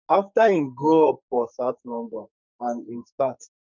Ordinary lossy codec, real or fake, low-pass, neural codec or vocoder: none; fake; 7.2 kHz; codec, 44.1 kHz, 2.6 kbps, SNAC